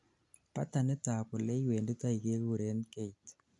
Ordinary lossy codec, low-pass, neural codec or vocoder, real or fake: none; 10.8 kHz; none; real